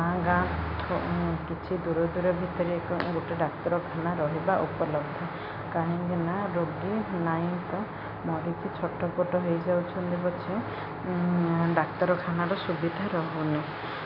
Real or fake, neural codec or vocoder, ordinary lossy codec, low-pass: real; none; none; 5.4 kHz